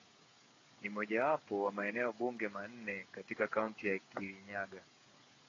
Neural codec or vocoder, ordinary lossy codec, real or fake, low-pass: codec, 16 kHz, 8 kbps, FreqCodec, smaller model; AAC, 32 kbps; fake; 7.2 kHz